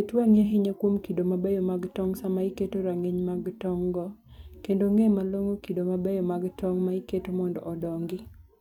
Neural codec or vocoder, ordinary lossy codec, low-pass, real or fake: vocoder, 44.1 kHz, 128 mel bands every 256 samples, BigVGAN v2; none; 19.8 kHz; fake